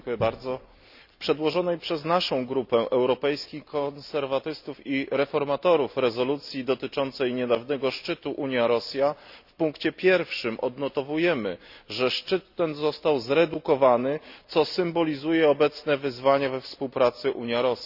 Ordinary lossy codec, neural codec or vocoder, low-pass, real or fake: none; none; 5.4 kHz; real